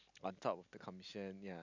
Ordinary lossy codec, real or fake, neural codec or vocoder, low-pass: none; real; none; 7.2 kHz